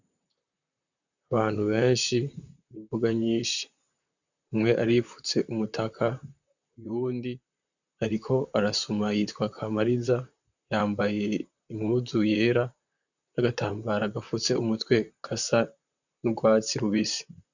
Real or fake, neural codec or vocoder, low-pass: fake; vocoder, 44.1 kHz, 128 mel bands, Pupu-Vocoder; 7.2 kHz